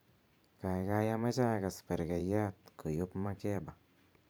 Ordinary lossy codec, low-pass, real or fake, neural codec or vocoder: none; none; fake; vocoder, 44.1 kHz, 128 mel bands every 512 samples, BigVGAN v2